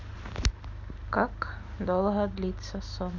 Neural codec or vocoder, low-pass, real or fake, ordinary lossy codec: none; 7.2 kHz; real; none